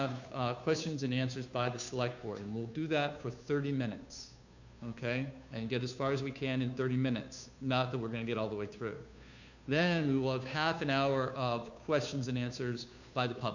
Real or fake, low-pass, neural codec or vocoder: fake; 7.2 kHz; codec, 16 kHz, 2 kbps, FunCodec, trained on Chinese and English, 25 frames a second